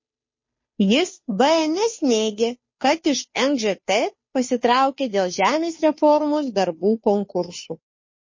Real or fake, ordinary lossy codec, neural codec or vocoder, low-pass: fake; MP3, 32 kbps; codec, 16 kHz, 2 kbps, FunCodec, trained on Chinese and English, 25 frames a second; 7.2 kHz